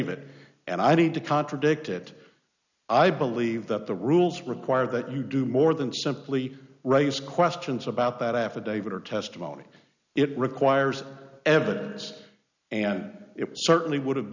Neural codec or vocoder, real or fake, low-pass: none; real; 7.2 kHz